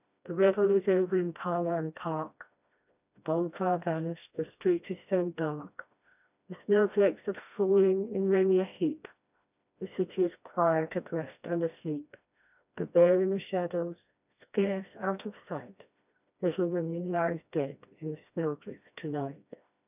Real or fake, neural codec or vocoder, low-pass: fake; codec, 16 kHz, 1 kbps, FreqCodec, smaller model; 3.6 kHz